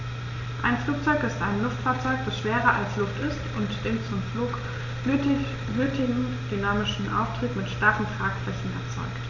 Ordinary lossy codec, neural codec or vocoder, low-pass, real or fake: none; none; 7.2 kHz; real